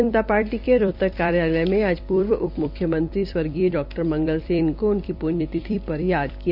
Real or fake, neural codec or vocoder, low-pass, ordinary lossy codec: fake; vocoder, 44.1 kHz, 128 mel bands every 256 samples, BigVGAN v2; 5.4 kHz; none